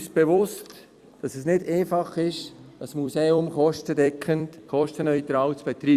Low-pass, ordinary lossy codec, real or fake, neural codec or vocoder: 14.4 kHz; Opus, 64 kbps; fake; vocoder, 44.1 kHz, 128 mel bands every 256 samples, BigVGAN v2